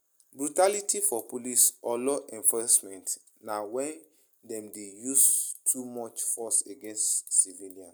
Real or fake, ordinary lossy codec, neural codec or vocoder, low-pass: real; none; none; none